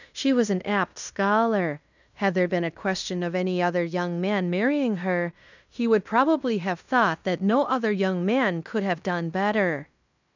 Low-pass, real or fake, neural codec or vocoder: 7.2 kHz; fake; codec, 16 kHz in and 24 kHz out, 0.9 kbps, LongCat-Audio-Codec, fine tuned four codebook decoder